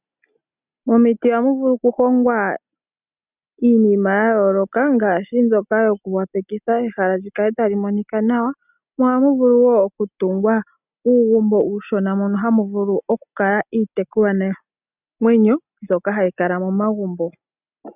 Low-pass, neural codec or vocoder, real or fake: 3.6 kHz; none; real